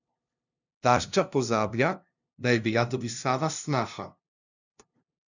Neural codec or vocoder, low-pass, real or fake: codec, 16 kHz, 0.5 kbps, FunCodec, trained on LibriTTS, 25 frames a second; 7.2 kHz; fake